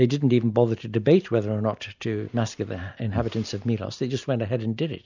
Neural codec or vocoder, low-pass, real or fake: none; 7.2 kHz; real